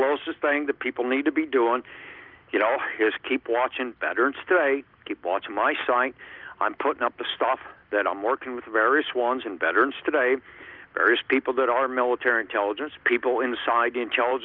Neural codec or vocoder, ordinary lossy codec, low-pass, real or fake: none; Opus, 24 kbps; 5.4 kHz; real